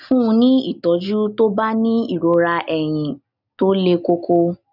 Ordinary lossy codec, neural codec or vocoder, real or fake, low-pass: none; none; real; 5.4 kHz